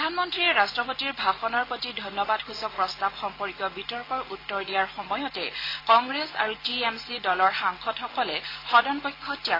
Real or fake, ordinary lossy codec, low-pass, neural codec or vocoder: real; AAC, 24 kbps; 5.4 kHz; none